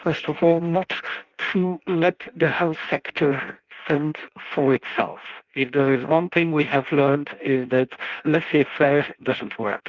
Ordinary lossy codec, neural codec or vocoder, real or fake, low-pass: Opus, 32 kbps; codec, 16 kHz in and 24 kHz out, 0.6 kbps, FireRedTTS-2 codec; fake; 7.2 kHz